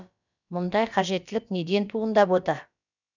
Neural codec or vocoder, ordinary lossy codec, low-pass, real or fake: codec, 16 kHz, about 1 kbps, DyCAST, with the encoder's durations; none; 7.2 kHz; fake